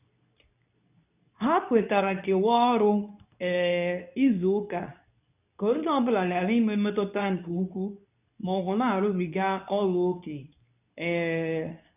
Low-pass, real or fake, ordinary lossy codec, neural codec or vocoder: 3.6 kHz; fake; none; codec, 24 kHz, 0.9 kbps, WavTokenizer, medium speech release version 2